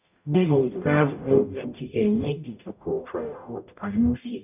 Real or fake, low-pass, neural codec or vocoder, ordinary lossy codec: fake; 3.6 kHz; codec, 44.1 kHz, 0.9 kbps, DAC; none